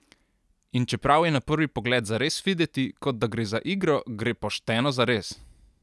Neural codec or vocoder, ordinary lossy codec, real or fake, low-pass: none; none; real; none